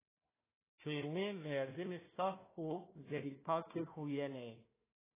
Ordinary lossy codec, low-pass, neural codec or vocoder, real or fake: AAC, 16 kbps; 3.6 kHz; codec, 16 kHz, 1 kbps, FunCodec, trained on Chinese and English, 50 frames a second; fake